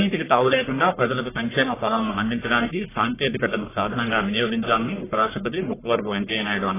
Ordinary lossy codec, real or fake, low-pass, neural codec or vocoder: AAC, 16 kbps; fake; 3.6 kHz; codec, 44.1 kHz, 1.7 kbps, Pupu-Codec